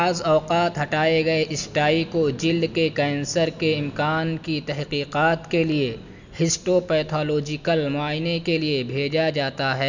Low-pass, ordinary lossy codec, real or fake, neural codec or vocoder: 7.2 kHz; none; real; none